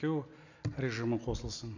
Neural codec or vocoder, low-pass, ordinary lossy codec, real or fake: none; 7.2 kHz; none; real